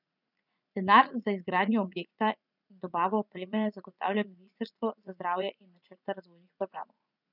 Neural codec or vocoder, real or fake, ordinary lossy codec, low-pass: vocoder, 44.1 kHz, 80 mel bands, Vocos; fake; none; 5.4 kHz